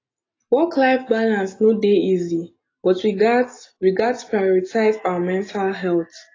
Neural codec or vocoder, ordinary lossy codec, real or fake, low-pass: none; AAC, 32 kbps; real; 7.2 kHz